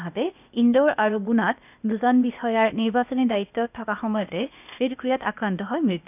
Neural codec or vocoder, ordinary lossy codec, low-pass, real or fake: codec, 16 kHz, 0.8 kbps, ZipCodec; none; 3.6 kHz; fake